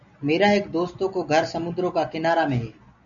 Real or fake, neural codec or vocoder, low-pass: real; none; 7.2 kHz